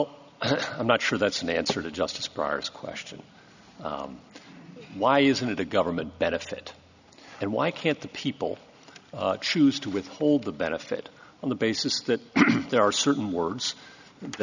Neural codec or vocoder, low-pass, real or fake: none; 7.2 kHz; real